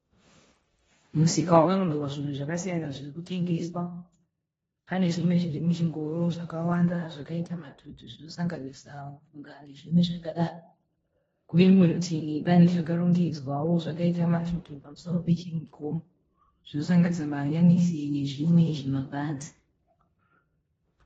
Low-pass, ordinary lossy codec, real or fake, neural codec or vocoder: 10.8 kHz; AAC, 24 kbps; fake; codec, 16 kHz in and 24 kHz out, 0.9 kbps, LongCat-Audio-Codec, four codebook decoder